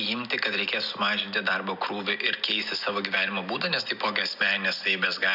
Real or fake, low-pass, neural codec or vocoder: real; 5.4 kHz; none